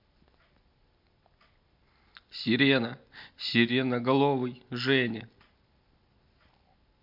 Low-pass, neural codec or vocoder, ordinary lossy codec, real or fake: 5.4 kHz; none; MP3, 48 kbps; real